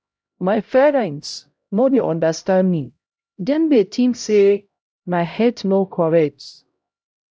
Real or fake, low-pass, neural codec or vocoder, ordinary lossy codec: fake; none; codec, 16 kHz, 0.5 kbps, X-Codec, HuBERT features, trained on LibriSpeech; none